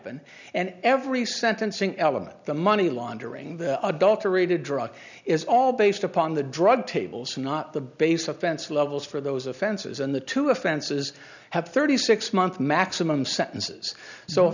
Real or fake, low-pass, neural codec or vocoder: real; 7.2 kHz; none